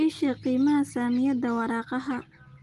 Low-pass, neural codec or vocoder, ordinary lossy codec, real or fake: 10.8 kHz; none; Opus, 24 kbps; real